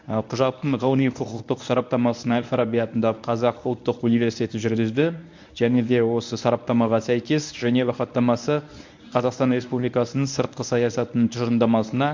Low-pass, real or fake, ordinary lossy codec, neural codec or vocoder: 7.2 kHz; fake; MP3, 64 kbps; codec, 24 kHz, 0.9 kbps, WavTokenizer, medium speech release version 1